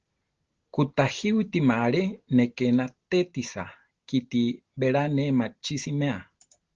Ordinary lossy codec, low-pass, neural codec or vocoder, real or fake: Opus, 16 kbps; 7.2 kHz; none; real